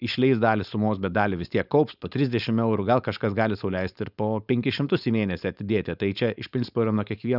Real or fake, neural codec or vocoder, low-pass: fake; codec, 16 kHz, 4.8 kbps, FACodec; 5.4 kHz